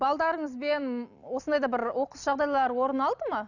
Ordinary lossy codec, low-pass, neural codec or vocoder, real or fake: none; 7.2 kHz; none; real